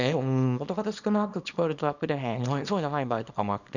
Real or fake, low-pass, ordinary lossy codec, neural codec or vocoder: fake; 7.2 kHz; none; codec, 24 kHz, 0.9 kbps, WavTokenizer, small release